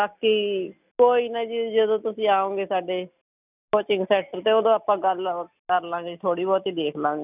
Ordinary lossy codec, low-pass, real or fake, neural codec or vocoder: none; 3.6 kHz; real; none